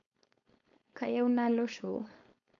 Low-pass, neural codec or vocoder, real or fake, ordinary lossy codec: 7.2 kHz; codec, 16 kHz, 4.8 kbps, FACodec; fake; none